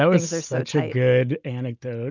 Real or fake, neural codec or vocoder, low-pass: real; none; 7.2 kHz